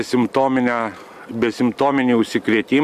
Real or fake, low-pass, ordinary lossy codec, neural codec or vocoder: real; 14.4 kHz; MP3, 96 kbps; none